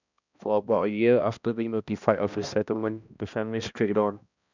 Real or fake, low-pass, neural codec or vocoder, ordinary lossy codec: fake; 7.2 kHz; codec, 16 kHz, 1 kbps, X-Codec, HuBERT features, trained on balanced general audio; none